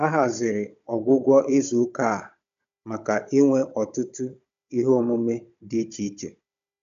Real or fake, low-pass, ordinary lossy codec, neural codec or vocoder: fake; 7.2 kHz; AAC, 96 kbps; codec, 16 kHz, 16 kbps, FunCodec, trained on Chinese and English, 50 frames a second